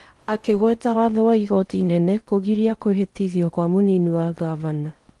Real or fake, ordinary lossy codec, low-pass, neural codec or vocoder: fake; Opus, 24 kbps; 10.8 kHz; codec, 16 kHz in and 24 kHz out, 0.8 kbps, FocalCodec, streaming, 65536 codes